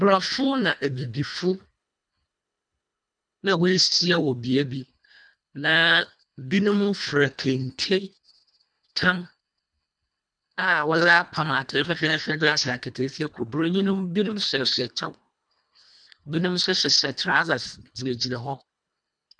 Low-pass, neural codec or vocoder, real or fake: 9.9 kHz; codec, 24 kHz, 1.5 kbps, HILCodec; fake